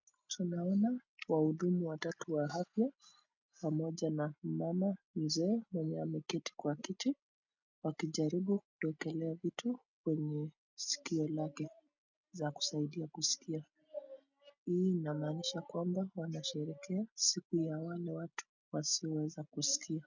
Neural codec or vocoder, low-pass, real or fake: none; 7.2 kHz; real